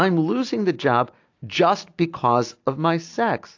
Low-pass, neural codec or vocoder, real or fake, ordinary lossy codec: 7.2 kHz; none; real; AAC, 48 kbps